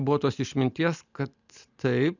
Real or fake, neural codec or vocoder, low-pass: real; none; 7.2 kHz